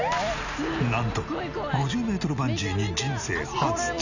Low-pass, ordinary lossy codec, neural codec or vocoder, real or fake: 7.2 kHz; none; none; real